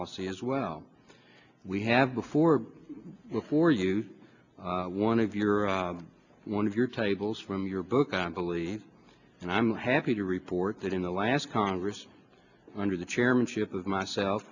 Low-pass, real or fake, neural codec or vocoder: 7.2 kHz; real; none